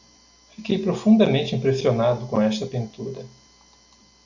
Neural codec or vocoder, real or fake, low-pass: none; real; 7.2 kHz